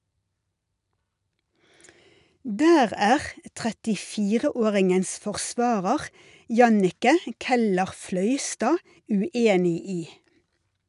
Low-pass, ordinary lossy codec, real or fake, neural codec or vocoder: 10.8 kHz; none; real; none